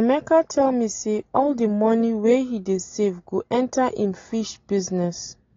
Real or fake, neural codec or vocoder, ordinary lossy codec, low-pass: real; none; AAC, 32 kbps; 7.2 kHz